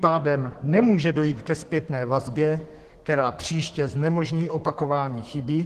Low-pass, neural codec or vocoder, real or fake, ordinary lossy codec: 14.4 kHz; codec, 32 kHz, 1.9 kbps, SNAC; fake; Opus, 16 kbps